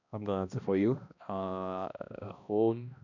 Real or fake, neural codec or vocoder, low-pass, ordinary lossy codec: fake; codec, 16 kHz, 1 kbps, X-Codec, HuBERT features, trained on balanced general audio; 7.2 kHz; none